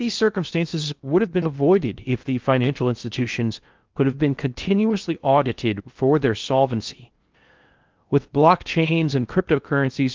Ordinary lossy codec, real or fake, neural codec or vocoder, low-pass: Opus, 32 kbps; fake; codec, 16 kHz in and 24 kHz out, 0.6 kbps, FocalCodec, streaming, 2048 codes; 7.2 kHz